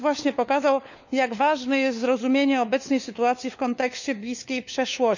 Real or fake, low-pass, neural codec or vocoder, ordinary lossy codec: fake; 7.2 kHz; codec, 16 kHz, 4 kbps, FunCodec, trained on LibriTTS, 50 frames a second; none